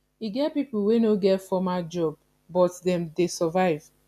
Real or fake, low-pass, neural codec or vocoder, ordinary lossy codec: real; 14.4 kHz; none; none